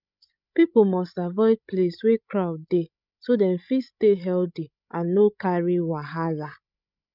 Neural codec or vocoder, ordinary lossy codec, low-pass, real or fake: codec, 16 kHz, 16 kbps, FreqCodec, larger model; none; 5.4 kHz; fake